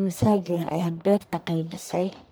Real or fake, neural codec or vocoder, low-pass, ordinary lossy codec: fake; codec, 44.1 kHz, 1.7 kbps, Pupu-Codec; none; none